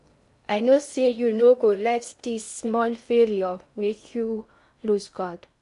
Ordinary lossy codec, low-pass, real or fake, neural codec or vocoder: none; 10.8 kHz; fake; codec, 16 kHz in and 24 kHz out, 0.6 kbps, FocalCodec, streaming, 4096 codes